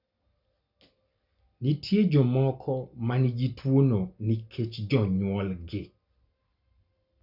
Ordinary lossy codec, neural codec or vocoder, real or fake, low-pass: none; none; real; 5.4 kHz